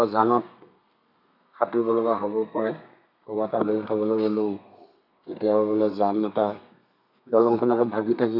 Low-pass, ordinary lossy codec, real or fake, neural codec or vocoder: 5.4 kHz; none; fake; codec, 32 kHz, 1.9 kbps, SNAC